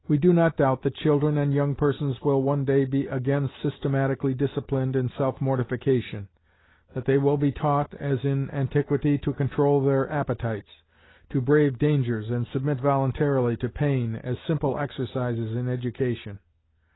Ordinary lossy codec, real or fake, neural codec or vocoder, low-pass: AAC, 16 kbps; real; none; 7.2 kHz